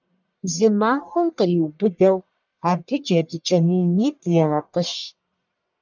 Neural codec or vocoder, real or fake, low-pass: codec, 44.1 kHz, 1.7 kbps, Pupu-Codec; fake; 7.2 kHz